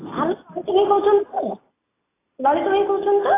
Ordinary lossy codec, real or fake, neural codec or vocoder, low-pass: AAC, 16 kbps; real; none; 3.6 kHz